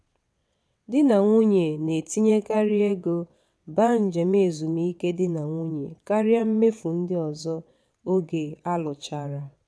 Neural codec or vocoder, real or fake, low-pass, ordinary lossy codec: vocoder, 22.05 kHz, 80 mel bands, WaveNeXt; fake; none; none